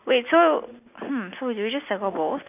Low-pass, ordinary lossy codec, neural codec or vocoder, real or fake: 3.6 kHz; none; none; real